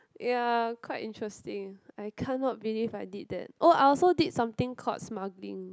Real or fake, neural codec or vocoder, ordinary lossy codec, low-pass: real; none; none; none